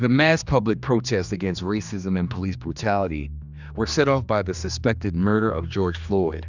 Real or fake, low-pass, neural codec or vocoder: fake; 7.2 kHz; codec, 16 kHz, 2 kbps, X-Codec, HuBERT features, trained on general audio